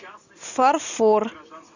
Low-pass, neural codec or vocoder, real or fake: 7.2 kHz; none; real